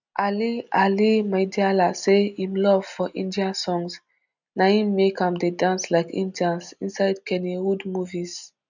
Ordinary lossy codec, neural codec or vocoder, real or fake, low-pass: none; none; real; 7.2 kHz